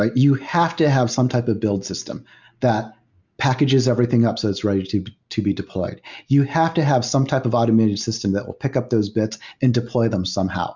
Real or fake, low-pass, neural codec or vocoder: real; 7.2 kHz; none